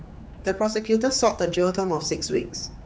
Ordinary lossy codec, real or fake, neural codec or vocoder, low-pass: none; fake; codec, 16 kHz, 4 kbps, X-Codec, HuBERT features, trained on general audio; none